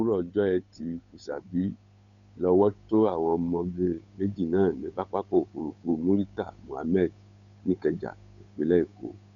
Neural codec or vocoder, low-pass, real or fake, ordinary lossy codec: codec, 16 kHz, 8 kbps, FunCodec, trained on Chinese and English, 25 frames a second; 7.2 kHz; fake; none